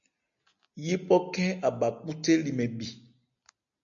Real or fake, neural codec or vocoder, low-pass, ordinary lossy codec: real; none; 7.2 kHz; MP3, 64 kbps